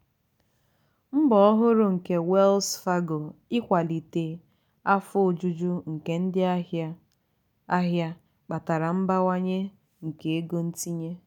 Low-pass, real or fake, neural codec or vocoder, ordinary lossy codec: 19.8 kHz; real; none; none